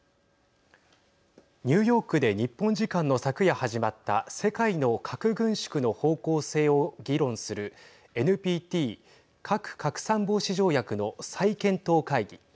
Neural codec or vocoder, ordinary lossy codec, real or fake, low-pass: none; none; real; none